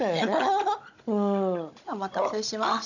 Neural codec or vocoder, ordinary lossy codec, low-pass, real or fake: codec, 16 kHz, 16 kbps, FunCodec, trained on Chinese and English, 50 frames a second; none; 7.2 kHz; fake